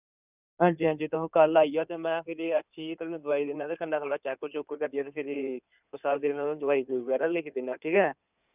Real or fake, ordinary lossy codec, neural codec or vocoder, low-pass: fake; none; codec, 16 kHz in and 24 kHz out, 2.2 kbps, FireRedTTS-2 codec; 3.6 kHz